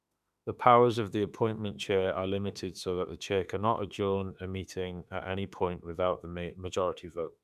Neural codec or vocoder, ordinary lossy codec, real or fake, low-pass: autoencoder, 48 kHz, 32 numbers a frame, DAC-VAE, trained on Japanese speech; none; fake; 14.4 kHz